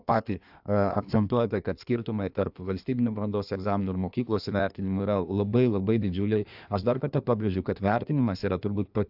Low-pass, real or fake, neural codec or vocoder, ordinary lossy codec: 5.4 kHz; fake; codec, 16 kHz in and 24 kHz out, 1.1 kbps, FireRedTTS-2 codec; AAC, 48 kbps